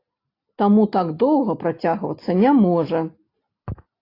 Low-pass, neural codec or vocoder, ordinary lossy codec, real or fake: 5.4 kHz; none; AAC, 32 kbps; real